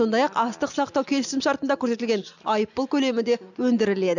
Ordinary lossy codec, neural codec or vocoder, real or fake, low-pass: none; none; real; 7.2 kHz